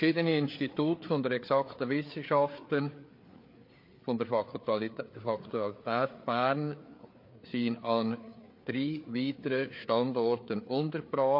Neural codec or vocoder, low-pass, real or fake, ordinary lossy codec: codec, 16 kHz, 4 kbps, FreqCodec, larger model; 5.4 kHz; fake; MP3, 32 kbps